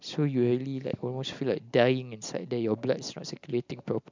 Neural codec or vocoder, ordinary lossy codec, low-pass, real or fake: none; MP3, 64 kbps; 7.2 kHz; real